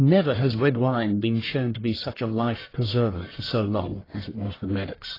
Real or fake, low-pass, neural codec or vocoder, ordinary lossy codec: fake; 5.4 kHz; codec, 44.1 kHz, 1.7 kbps, Pupu-Codec; AAC, 24 kbps